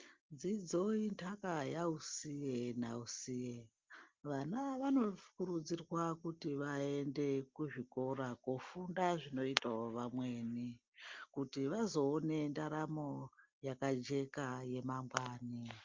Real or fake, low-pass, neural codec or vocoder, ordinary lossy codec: real; 7.2 kHz; none; Opus, 32 kbps